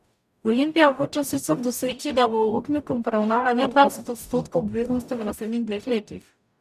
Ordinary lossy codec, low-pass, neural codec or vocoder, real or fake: none; 14.4 kHz; codec, 44.1 kHz, 0.9 kbps, DAC; fake